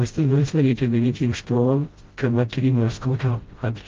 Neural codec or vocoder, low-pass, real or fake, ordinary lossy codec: codec, 16 kHz, 0.5 kbps, FreqCodec, smaller model; 7.2 kHz; fake; Opus, 32 kbps